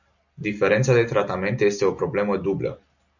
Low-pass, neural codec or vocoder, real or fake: 7.2 kHz; none; real